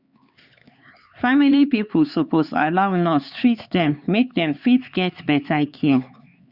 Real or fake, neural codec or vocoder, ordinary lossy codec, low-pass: fake; codec, 16 kHz, 4 kbps, X-Codec, HuBERT features, trained on LibriSpeech; Opus, 64 kbps; 5.4 kHz